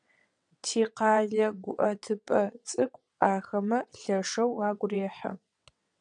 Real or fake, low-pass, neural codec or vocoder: fake; 9.9 kHz; vocoder, 22.05 kHz, 80 mel bands, WaveNeXt